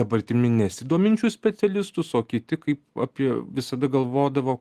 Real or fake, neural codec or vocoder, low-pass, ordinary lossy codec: real; none; 14.4 kHz; Opus, 24 kbps